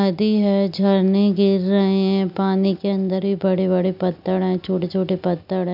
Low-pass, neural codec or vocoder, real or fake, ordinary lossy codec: 5.4 kHz; none; real; none